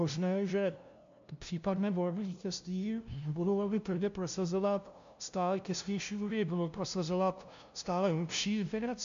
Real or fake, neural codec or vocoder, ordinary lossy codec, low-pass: fake; codec, 16 kHz, 0.5 kbps, FunCodec, trained on LibriTTS, 25 frames a second; MP3, 64 kbps; 7.2 kHz